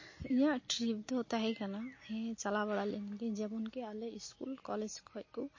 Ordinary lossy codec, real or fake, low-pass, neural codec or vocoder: MP3, 32 kbps; real; 7.2 kHz; none